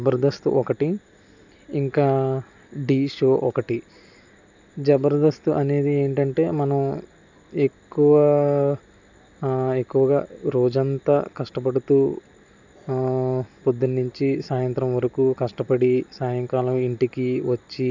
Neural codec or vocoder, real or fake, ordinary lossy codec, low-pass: none; real; none; 7.2 kHz